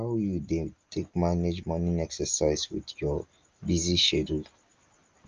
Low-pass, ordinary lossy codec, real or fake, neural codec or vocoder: 7.2 kHz; Opus, 24 kbps; real; none